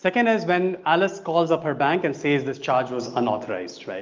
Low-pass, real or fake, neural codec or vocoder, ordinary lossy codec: 7.2 kHz; real; none; Opus, 24 kbps